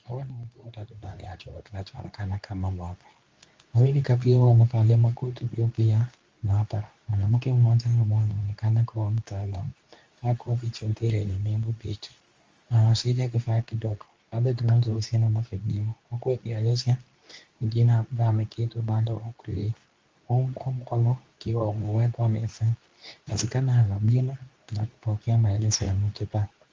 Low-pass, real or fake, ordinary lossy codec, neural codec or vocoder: 7.2 kHz; fake; Opus, 32 kbps; codec, 24 kHz, 0.9 kbps, WavTokenizer, medium speech release version 2